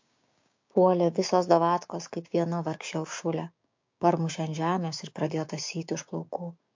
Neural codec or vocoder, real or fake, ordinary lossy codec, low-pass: codec, 16 kHz, 6 kbps, DAC; fake; MP3, 48 kbps; 7.2 kHz